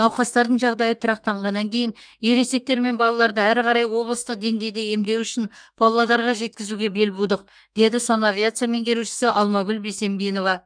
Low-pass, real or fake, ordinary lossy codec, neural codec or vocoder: 9.9 kHz; fake; none; codec, 32 kHz, 1.9 kbps, SNAC